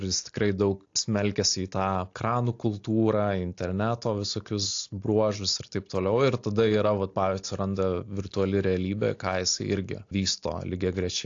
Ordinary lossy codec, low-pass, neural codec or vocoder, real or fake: AAC, 48 kbps; 7.2 kHz; none; real